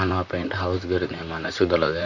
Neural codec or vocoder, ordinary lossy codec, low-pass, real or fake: vocoder, 44.1 kHz, 128 mel bands, Pupu-Vocoder; none; 7.2 kHz; fake